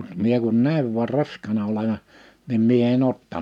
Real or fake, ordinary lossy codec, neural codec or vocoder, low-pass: real; none; none; 19.8 kHz